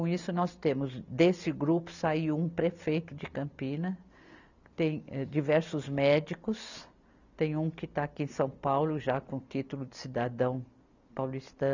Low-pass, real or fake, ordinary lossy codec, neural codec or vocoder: 7.2 kHz; real; none; none